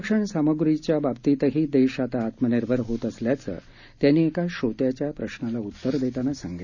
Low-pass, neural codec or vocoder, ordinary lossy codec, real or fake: 7.2 kHz; none; none; real